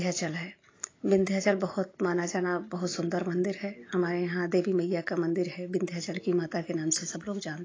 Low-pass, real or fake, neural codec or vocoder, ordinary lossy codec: 7.2 kHz; real; none; AAC, 32 kbps